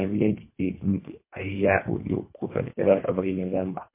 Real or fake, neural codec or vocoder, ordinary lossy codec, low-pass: fake; codec, 24 kHz, 1.5 kbps, HILCodec; MP3, 24 kbps; 3.6 kHz